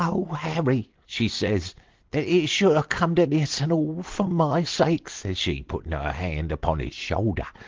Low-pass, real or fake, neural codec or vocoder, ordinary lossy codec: 7.2 kHz; real; none; Opus, 16 kbps